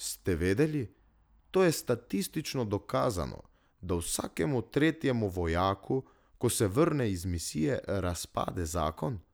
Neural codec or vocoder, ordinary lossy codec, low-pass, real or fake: none; none; none; real